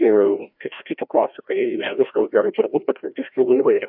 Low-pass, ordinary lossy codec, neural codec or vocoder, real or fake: 5.4 kHz; AAC, 48 kbps; codec, 16 kHz, 1 kbps, FreqCodec, larger model; fake